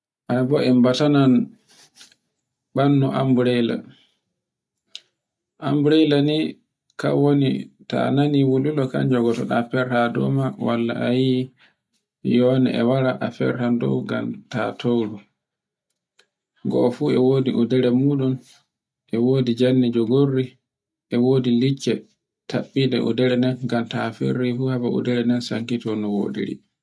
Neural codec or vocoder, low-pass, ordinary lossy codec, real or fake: none; none; none; real